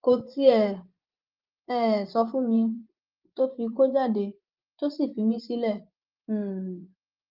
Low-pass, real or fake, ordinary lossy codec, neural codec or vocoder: 5.4 kHz; fake; Opus, 32 kbps; vocoder, 24 kHz, 100 mel bands, Vocos